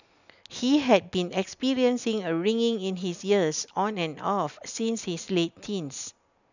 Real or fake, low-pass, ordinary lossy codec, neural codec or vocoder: real; 7.2 kHz; none; none